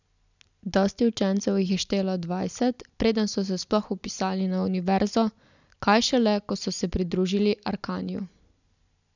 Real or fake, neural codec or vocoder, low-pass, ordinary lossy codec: real; none; 7.2 kHz; none